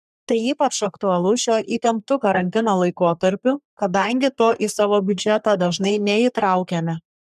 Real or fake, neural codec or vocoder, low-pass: fake; codec, 44.1 kHz, 3.4 kbps, Pupu-Codec; 14.4 kHz